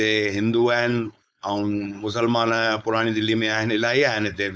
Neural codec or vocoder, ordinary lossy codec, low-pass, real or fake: codec, 16 kHz, 4.8 kbps, FACodec; none; none; fake